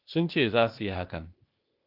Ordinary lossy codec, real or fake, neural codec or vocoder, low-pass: Opus, 24 kbps; fake; codec, 16 kHz, 0.8 kbps, ZipCodec; 5.4 kHz